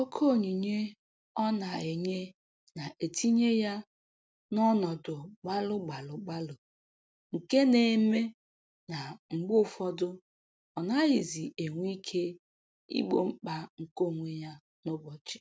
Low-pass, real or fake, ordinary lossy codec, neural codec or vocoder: none; real; none; none